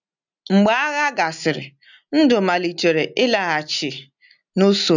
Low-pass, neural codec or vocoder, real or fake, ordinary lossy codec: 7.2 kHz; none; real; none